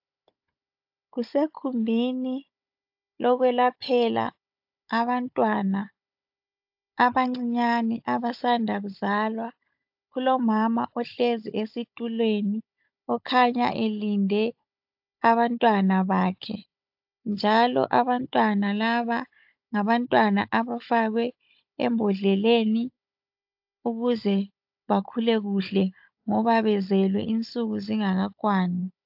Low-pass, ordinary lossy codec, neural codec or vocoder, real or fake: 5.4 kHz; AAC, 48 kbps; codec, 16 kHz, 16 kbps, FunCodec, trained on Chinese and English, 50 frames a second; fake